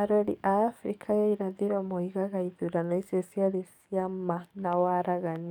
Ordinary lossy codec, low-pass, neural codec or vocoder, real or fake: none; 19.8 kHz; codec, 44.1 kHz, 7.8 kbps, DAC; fake